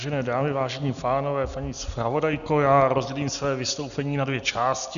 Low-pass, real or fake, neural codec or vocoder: 7.2 kHz; real; none